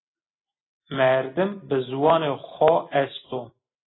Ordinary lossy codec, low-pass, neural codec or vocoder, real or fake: AAC, 16 kbps; 7.2 kHz; none; real